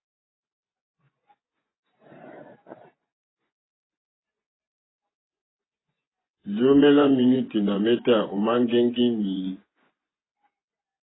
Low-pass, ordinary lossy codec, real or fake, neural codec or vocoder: 7.2 kHz; AAC, 16 kbps; fake; vocoder, 24 kHz, 100 mel bands, Vocos